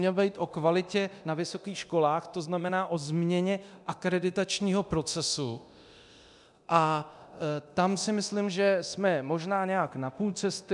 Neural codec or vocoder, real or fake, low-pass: codec, 24 kHz, 0.9 kbps, DualCodec; fake; 10.8 kHz